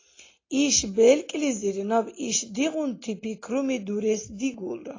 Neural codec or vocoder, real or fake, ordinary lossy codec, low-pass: none; real; AAC, 32 kbps; 7.2 kHz